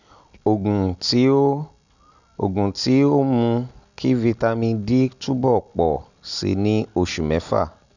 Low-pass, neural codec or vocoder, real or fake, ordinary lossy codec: 7.2 kHz; none; real; none